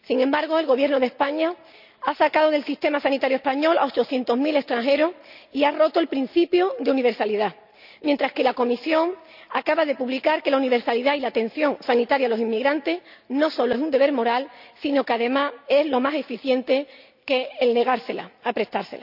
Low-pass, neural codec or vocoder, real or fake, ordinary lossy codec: 5.4 kHz; none; real; none